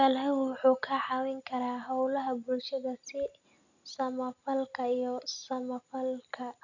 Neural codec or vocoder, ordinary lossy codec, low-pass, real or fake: none; none; 7.2 kHz; real